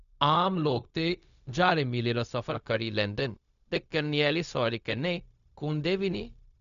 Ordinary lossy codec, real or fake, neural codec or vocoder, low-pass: MP3, 96 kbps; fake; codec, 16 kHz, 0.4 kbps, LongCat-Audio-Codec; 7.2 kHz